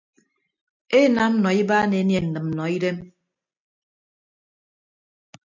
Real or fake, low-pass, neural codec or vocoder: real; 7.2 kHz; none